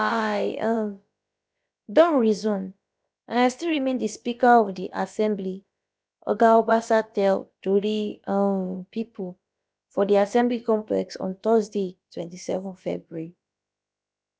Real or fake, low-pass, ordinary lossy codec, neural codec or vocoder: fake; none; none; codec, 16 kHz, about 1 kbps, DyCAST, with the encoder's durations